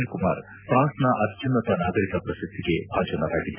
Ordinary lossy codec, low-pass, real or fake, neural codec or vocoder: none; 3.6 kHz; real; none